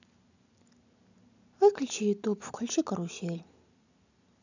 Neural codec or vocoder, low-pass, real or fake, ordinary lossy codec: none; 7.2 kHz; real; none